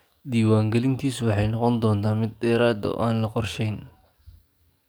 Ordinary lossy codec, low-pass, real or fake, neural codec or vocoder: none; none; fake; codec, 44.1 kHz, 7.8 kbps, DAC